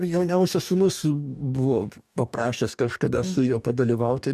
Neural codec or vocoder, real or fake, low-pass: codec, 44.1 kHz, 2.6 kbps, DAC; fake; 14.4 kHz